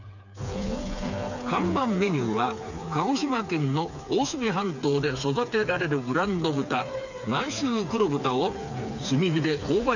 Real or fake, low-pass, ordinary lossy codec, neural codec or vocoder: fake; 7.2 kHz; none; codec, 16 kHz, 4 kbps, FreqCodec, smaller model